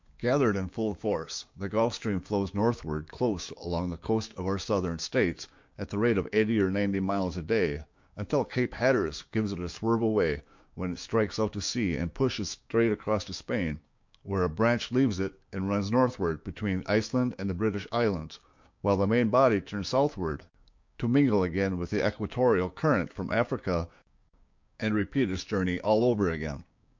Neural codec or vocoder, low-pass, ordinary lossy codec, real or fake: codec, 16 kHz, 6 kbps, DAC; 7.2 kHz; MP3, 48 kbps; fake